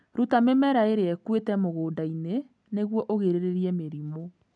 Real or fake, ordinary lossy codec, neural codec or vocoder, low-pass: real; none; none; 9.9 kHz